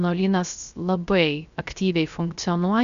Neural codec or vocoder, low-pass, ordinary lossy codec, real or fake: codec, 16 kHz, 0.3 kbps, FocalCodec; 7.2 kHz; Opus, 64 kbps; fake